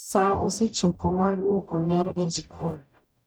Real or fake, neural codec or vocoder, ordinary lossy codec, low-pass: fake; codec, 44.1 kHz, 0.9 kbps, DAC; none; none